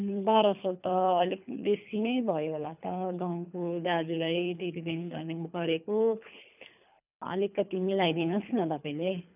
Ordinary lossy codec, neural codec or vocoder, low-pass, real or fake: none; codec, 24 kHz, 3 kbps, HILCodec; 3.6 kHz; fake